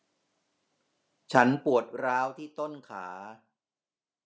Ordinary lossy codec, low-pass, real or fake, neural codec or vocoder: none; none; real; none